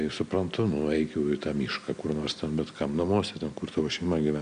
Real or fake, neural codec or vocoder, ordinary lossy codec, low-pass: real; none; AAC, 96 kbps; 9.9 kHz